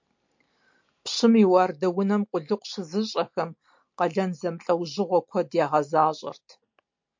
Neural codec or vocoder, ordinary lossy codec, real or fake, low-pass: none; MP3, 48 kbps; real; 7.2 kHz